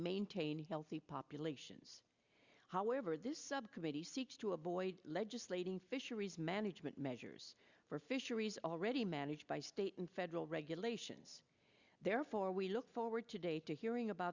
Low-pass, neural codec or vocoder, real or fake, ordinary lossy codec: 7.2 kHz; none; real; Opus, 64 kbps